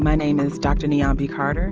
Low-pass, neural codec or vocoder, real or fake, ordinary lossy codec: 7.2 kHz; none; real; Opus, 24 kbps